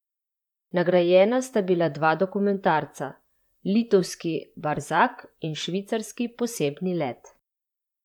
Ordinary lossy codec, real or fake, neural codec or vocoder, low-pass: none; fake; vocoder, 44.1 kHz, 128 mel bands, Pupu-Vocoder; 19.8 kHz